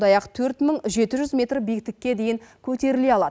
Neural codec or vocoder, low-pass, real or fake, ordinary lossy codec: none; none; real; none